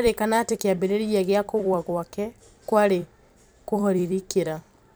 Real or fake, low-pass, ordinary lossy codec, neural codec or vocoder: fake; none; none; vocoder, 44.1 kHz, 128 mel bands every 256 samples, BigVGAN v2